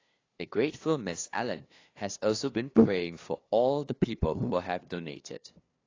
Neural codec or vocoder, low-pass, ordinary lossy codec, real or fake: codec, 16 kHz, 2 kbps, FunCodec, trained on LibriTTS, 25 frames a second; 7.2 kHz; AAC, 32 kbps; fake